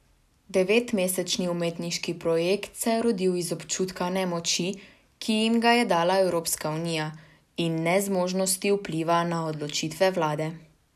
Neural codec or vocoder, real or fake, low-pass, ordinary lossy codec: none; real; 14.4 kHz; none